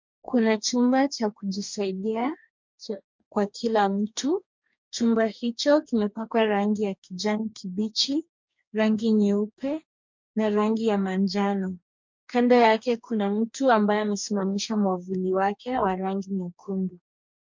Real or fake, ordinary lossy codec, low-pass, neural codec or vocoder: fake; MP3, 64 kbps; 7.2 kHz; codec, 44.1 kHz, 2.6 kbps, DAC